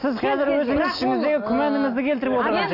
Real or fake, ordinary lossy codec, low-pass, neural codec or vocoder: real; AAC, 32 kbps; 5.4 kHz; none